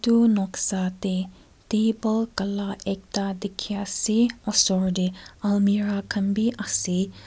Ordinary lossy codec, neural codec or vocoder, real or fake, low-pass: none; none; real; none